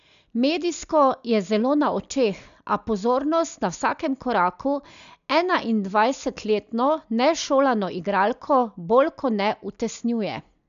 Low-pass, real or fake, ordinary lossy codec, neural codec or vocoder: 7.2 kHz; real; none; none